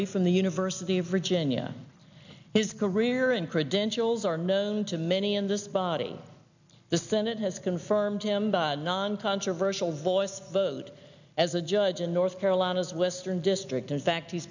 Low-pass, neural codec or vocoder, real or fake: 7.2 kHz; none; real